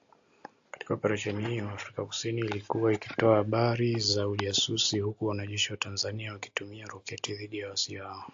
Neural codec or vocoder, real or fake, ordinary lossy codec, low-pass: none; real; AAC, 64 kbps; 7.2 kHz